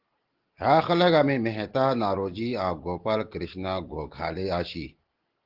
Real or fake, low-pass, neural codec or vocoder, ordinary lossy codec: real; 5.4 kHz; none; Opus, 16 kbps